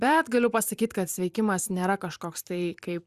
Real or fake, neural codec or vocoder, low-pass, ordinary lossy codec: fake; vocoder, 44.1 kHz, 128 mel bands every 256 samples, BigVGAN v2; 14.4 kHz; Opus, 64 kbps